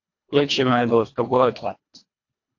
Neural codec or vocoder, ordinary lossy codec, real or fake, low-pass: codec, 24 kHz, 1.5 kbps, HILCodec; AAC, 48 kbps; fake; 7.2 kHz